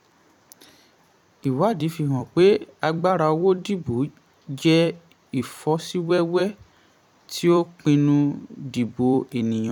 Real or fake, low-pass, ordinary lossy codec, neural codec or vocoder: fake; 19.8 kHz; none; vocoder, 44.1 kHz, 128 mel bands every 256 samples, BigVGAN v2